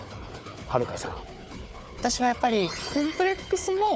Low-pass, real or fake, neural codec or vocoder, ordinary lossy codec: none; fake; codec, 16 kHz, 4 kbps, FunCodec, trained on Chinese and English, 50 frames a second; none